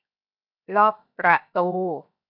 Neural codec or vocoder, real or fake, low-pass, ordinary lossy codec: codec, 16 kHz, 0.7 kbps, FocalCodec; fake; 5.4 kHz; none